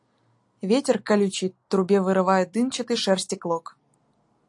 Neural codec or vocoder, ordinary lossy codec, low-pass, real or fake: none; AAC, 64 kbps; 10.8 kHz; real